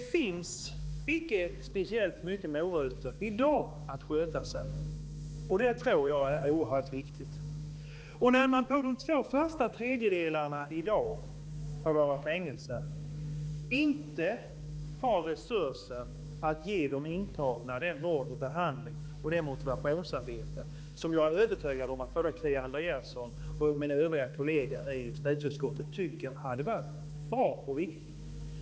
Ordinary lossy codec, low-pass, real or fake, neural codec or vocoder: none; none; fake; codec, 16 kHz, 2 kbps, X-Codec, HuBERT features, trained on balanced general audio